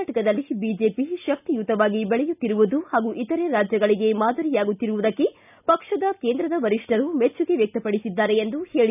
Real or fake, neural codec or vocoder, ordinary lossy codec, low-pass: real; none; none; 3.6 kHz